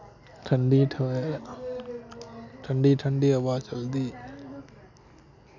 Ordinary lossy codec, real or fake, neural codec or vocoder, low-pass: none; real; none; 7.2 kHz